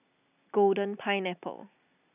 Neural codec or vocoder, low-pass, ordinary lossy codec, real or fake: none; 3.6 kHz; none; real